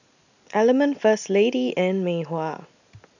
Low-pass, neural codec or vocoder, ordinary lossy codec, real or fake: 7.2 kHz; none; none; real